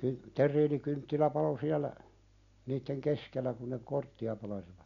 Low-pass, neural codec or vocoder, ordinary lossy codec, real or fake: 7.2 kHz; none; none; real